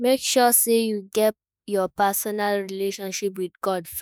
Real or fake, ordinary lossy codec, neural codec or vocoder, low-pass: fake; none; autoencoder, 48 kHz, 32 numbers a frame, DAC-VAE, trained on Japanese speech; none